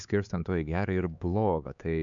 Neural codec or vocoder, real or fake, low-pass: codec, 16 kHz, 4 kbps, X-Codec, HuBERT features, trained on LibriSpeech; fake; 7.2 kHz